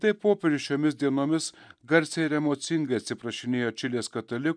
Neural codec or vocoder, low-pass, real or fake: none; 9.9 kHz; real